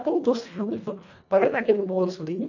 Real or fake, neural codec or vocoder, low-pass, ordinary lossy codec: fake; codec, 24 kHz, 1.5 kbps, HILCodec; 7.2 kHz; none